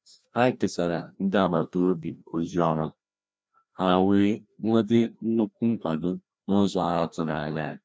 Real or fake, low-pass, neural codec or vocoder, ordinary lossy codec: fake; none; codec, 16 kHz, 1 kbps, FreqCodec, larger model; none